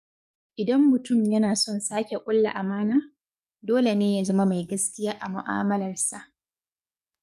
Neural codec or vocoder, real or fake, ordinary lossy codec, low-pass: codec, 44.1 kHz, 7.8 kbps, DAC; fake; none; 14.4 kHz